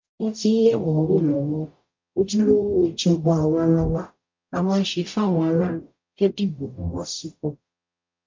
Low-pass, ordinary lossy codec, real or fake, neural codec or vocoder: 7.2 kHz; MP3, 48 kbps; fake; codec, 44.1 kHz, 0.9 kbps, DAC